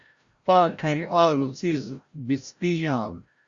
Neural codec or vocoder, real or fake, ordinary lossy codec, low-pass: codec, 16 kHz, 0.5 kbps, FreqCodec, larger model; fake; Opus, 64 kbps; 7.2 kHz